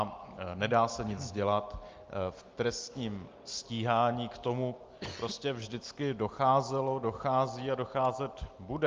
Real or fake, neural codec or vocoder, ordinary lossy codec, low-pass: real; none; Opus, 32 kbps; 7.2 kHz